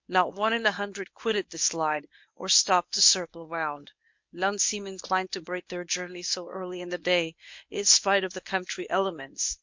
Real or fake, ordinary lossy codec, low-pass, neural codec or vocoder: fake; MP3, 48 kbps; 7.2 kHz; codec, 24 kHz, 0.9 kbps, WavTokenizer, medium speech release version 1